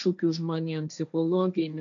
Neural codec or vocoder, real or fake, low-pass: codec, 16 kHz, 1.1 kbps, Voila-Tokenizer; fake; 7.2 kHz